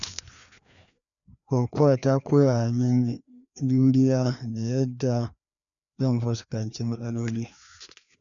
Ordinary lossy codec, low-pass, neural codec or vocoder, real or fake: none; 7.2 kHz; codec, 16 kHz, 2 kbps, FreqCodec, larger model; fake